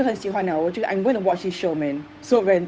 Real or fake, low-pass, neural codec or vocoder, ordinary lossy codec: fake; none; codec, 16 kHz, 8 kbps, FunCodec, trained on Chinese and English, 25 frames a second; none